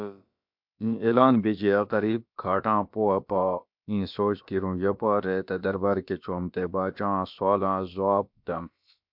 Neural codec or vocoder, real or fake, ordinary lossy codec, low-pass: codec, 16 kHz, about 1 kbps, DyCAST, with the encoder's durations; fake; MP3, 48 kbps; 5.4 kHz